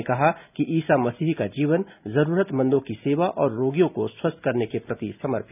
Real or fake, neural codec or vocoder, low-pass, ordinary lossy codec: real; none; 3.6 kHz; none